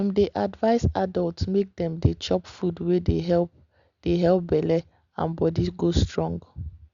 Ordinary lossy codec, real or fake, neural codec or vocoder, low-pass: Opus, 64 kbps; real; none; 7.2 kHz